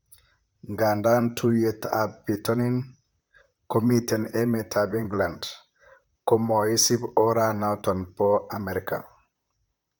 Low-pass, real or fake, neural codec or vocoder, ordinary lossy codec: none; fake; vocoder, 44.1 kHz, 128 mel bands, Pupu-Vocoder; none